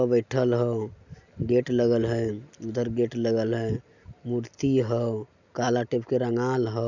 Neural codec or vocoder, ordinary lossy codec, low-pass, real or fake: none; none; 7.2 kHz; real